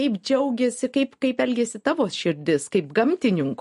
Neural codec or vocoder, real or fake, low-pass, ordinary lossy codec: none; real; 14.4 kHz; MP3, 48 kbps